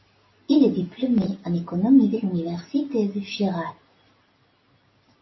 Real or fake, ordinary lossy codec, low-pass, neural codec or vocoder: real; MP3, 24 kbps; 7.2 kHz; none